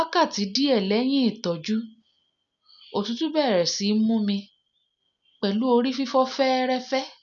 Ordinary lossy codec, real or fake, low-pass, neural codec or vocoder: none; real; 7.2 kHz; none